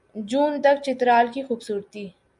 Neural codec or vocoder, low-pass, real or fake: none; 10.8 kHz; real